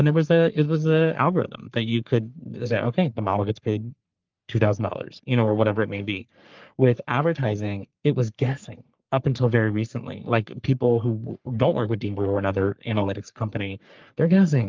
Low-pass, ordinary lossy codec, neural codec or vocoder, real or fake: 7.2 kHz; Opus, 32 kbps; codec, 44.1 kHz, 3.4 kbps, Pupu-Codec; fake